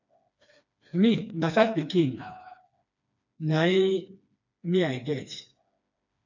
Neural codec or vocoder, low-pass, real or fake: codec, 16 kHz, 2 kbps, FreqCodec, smaller model; 7.2 kHz; fake